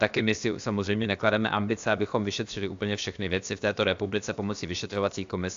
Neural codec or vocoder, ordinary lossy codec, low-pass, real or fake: codec, 16 kHz, about 1 kbps, DyCAST, with the encoder's durations; AAC, 64 kbps; 7.2 kHz; fake